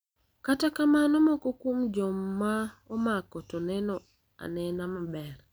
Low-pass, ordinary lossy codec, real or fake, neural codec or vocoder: none; none; real; none